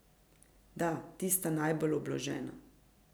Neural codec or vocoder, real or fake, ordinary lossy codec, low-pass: none; real; none; none